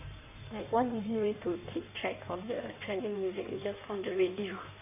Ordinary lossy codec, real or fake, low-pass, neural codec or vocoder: none; fake; 3.6 kHz; codec, 16 kHz in and 24 kHz out, 1.1 kbps, FireRedTTS-2 codec